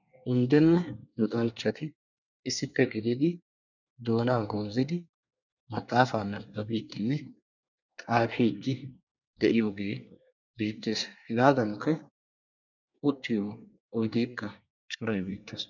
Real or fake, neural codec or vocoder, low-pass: fake; codec, 24 kHz, 1 kbps, SNAC; 7.2 kHz